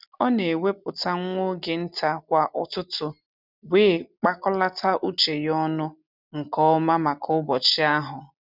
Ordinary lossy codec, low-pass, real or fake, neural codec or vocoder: none; 5.4 kHz; real; none